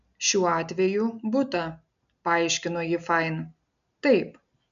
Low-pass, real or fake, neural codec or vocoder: 7.2 kHz; real; none